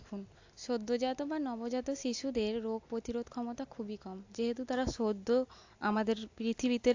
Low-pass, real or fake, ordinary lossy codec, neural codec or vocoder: 7.2 kHz; real; AAC, 48 kbps; none